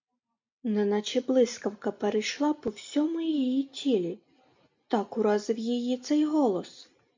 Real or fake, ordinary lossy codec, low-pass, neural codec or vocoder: real; MP3, 48 kbps; 7.2 kHz; none